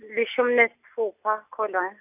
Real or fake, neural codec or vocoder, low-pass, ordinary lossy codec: real; none; 3.6 kHz; none